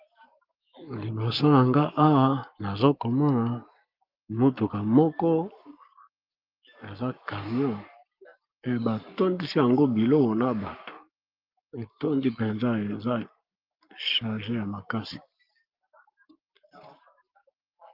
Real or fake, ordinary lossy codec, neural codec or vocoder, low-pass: fake; Opus, 24 kbps; codec, 44.1 kHz, 7.8 kbps, Pupu-Codec; 5.4 kHz